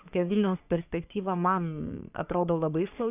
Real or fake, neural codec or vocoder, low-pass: fake; codec, 44.1 kHz, 3.4 kbps, Pupu-Codec; 3.6 kHz